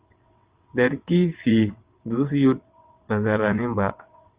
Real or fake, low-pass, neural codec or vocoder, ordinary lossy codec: fake; 3.6 kHz; vocoder, 22.05 kHz, 80 mel bands, WaveNeXt; Opus, 32 kbps